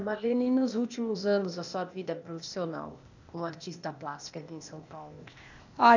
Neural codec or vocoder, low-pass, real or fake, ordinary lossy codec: codec, 16 kHz, 0.8 kbps, ZipCodec; 7.2 kHz; fake; none